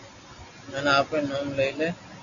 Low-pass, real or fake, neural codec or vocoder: 7.2 kHz; real; none